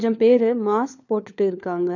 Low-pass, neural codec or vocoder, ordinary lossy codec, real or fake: 7.2 kHz; codec, 16 kHz, 4 kbps, FunCodec, trained on LibriTTS, 50 frames a second; none; fake